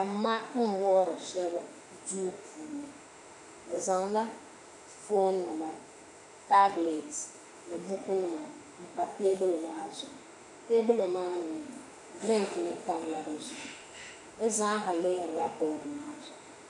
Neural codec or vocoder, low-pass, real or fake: autoencoder, 48 kHz, 32 numbers a frame, DAC-VAE, trained on Japanese speech; 10.8 kHz; fake